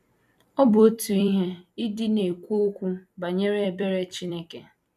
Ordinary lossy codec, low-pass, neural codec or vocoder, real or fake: none; 14.4 kHz; vocoder, 44.1 kHz, 128 mel bands every 256 samples, BigVGAN v2; fake